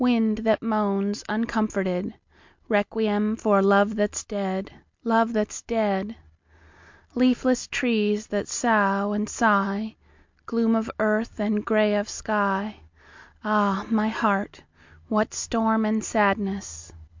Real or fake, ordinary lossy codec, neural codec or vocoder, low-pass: real; MP3, 64 kbps; none; 7.2 kHz